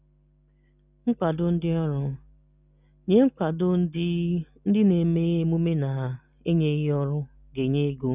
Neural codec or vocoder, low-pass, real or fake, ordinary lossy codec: none; 3.6 kHz; real; none